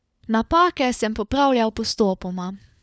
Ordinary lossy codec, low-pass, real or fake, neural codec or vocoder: none; none; fake; codec, 16 kHz, 4 kbps, FunCodec, trained on LibriTTS, 50 frames a second